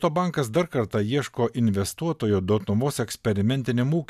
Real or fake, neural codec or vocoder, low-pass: real; none; 14.4 kHz